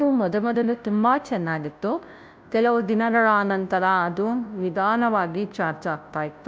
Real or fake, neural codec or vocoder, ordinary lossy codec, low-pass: fake; codec, 16 kHz, 0.5 kbps, FunCodec, trained on Chinese and English, 25 frames a second; none; none